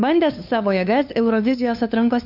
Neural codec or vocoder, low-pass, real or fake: codec, 16 kHz, 2 kbps, FunCodec, trained on LibriTTS, 25 frames a second; 5.4 kHz; fake